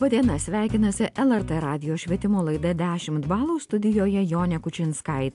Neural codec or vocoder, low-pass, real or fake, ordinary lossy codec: none; 10.8 kHz; real; AAC, 64 kbps